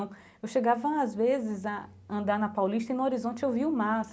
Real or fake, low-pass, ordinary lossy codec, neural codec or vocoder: real; none; none; none